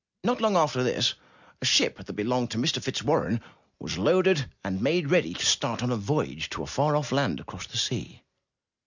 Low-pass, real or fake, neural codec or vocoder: 7.2 kHz; real; none